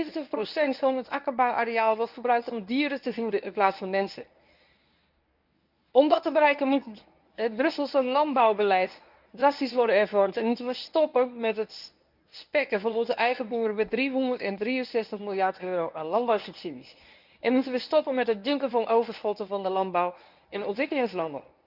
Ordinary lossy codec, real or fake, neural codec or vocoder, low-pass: none; fake; codec, 24 kHz, 0.9 kbps, WavTokenizer, medium speech release version 1; 5.4 kHz